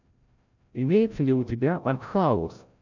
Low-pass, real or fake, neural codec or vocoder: 7.2 kHz; fake; codec, 16 kHz, 0.5 kbps, FreqCodec, larger model